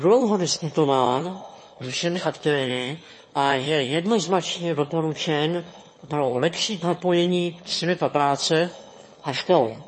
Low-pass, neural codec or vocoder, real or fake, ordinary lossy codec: 9.9 kHz; autoencoder, 22.05 kHz, a latent of 192 numbers a frame, VITS, trained on one speaker; fake; MP3, 32 kbps